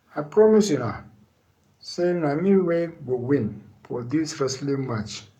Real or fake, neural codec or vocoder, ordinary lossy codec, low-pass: fake; codec, 44.1 kHz, 7.8 kbps, Pupu-Codec; none; 19.8 kHz